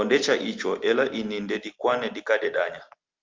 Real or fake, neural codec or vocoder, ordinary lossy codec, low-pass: real; none; Opus, 32 kbps; 7.2 kHz